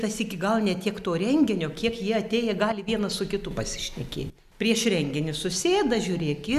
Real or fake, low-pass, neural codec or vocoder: real; 14.4 kHz; none